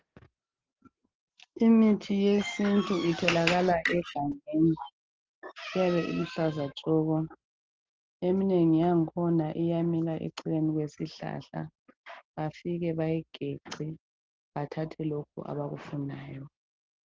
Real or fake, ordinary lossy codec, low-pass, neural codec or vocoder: real; Opus, 16 kbps; 7.2 kHz; none